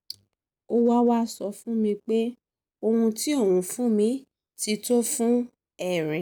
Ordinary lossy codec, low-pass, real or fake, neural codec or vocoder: none; none; real; none